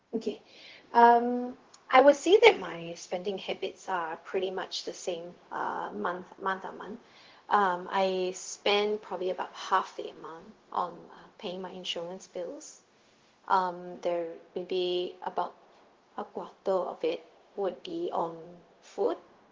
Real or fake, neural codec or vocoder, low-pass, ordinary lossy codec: fake; codec, 16 kHz, 0.4 kbps, LongCat-Audio-Codec; 7.2 kHz; Opus, 16 kbps